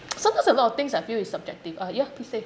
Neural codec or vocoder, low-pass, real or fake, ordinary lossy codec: none; none; real; none